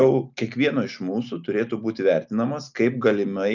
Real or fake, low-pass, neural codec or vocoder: real; 7.2 kHz; none